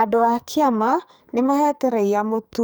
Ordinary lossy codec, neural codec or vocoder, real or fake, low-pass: none; codec, 44.1 kHz, 2.6 kbps, SNAC; fake; none